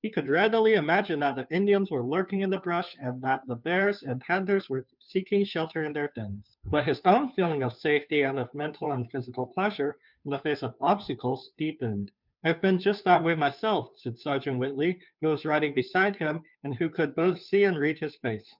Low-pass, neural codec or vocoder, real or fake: 5.4 kHz; codec, 16 kHz, 2 kbps, FunCodec, trained on Chinese and English, 25 frames a second; fake